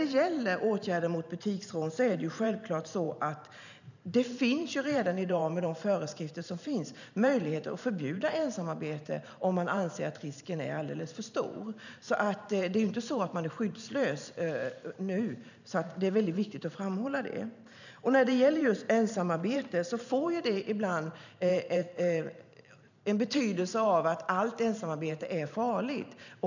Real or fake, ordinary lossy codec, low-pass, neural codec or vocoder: fake; none; 7.2 kHz; vocoder, 44.1 kHz, 128 mel bands every 512 samples, BigVGAN v2